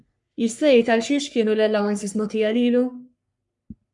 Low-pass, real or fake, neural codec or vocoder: 10.8 kHz; fake; codec, 44.1 kHz, 3.4 kbps, Pupu-Codec